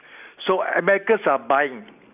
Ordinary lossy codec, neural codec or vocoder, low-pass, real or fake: none; none; 3.6 kHz; real